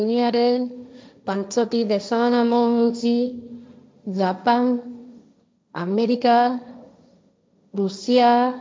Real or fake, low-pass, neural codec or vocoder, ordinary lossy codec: fake; none; codec, 16 kHz, 1.1 kbps, Voila-Tokenizer; none